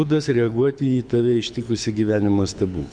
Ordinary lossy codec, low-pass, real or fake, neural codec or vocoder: MP3, 64 kbps; 9.9 kHz; fake; codec, 24 kHz, 6 kbps, HILCodec